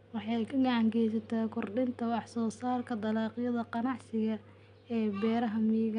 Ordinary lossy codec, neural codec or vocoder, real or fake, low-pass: none; none; real; 9.9 kHz